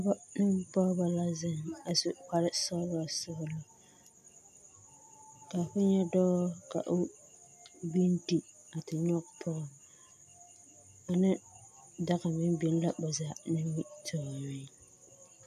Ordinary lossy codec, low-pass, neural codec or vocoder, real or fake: AAC, 96 kbps; 14.4 kHz; none; real